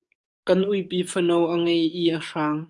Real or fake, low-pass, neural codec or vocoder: fake; 10.8 kHz; codec, 44.1 kHz, 7.8 kbps, DAC